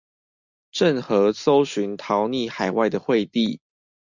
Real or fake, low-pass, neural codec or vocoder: real; 7.2 kHz; none